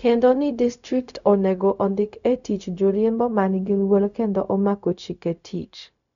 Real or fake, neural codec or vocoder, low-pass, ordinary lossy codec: fake; codec, 16 kHz, 0.4 kbps, LongCat-Audio-Codec; 7.2 kHz; none